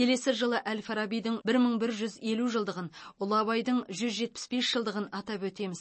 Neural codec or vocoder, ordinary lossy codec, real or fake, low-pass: none; MP3, 32 kbps; real; 9.9 kHz